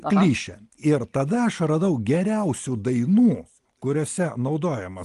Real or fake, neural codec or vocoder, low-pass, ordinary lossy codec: real; none; 10.8 kHz; Opus, 24 kbps